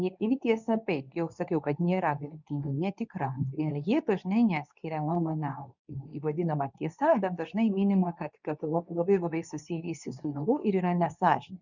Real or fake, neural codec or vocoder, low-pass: fake; codec, 24 kHz, 0.9 kbps, WavTokenizer, medium speech release version 2; 7.2 kHz